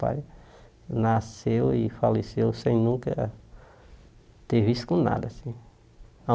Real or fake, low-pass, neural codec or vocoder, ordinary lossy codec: real; none; none; none